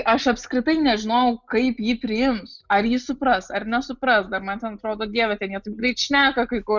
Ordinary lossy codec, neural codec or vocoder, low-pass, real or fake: Opus, 64 kbps; none; 7.2 kHz; real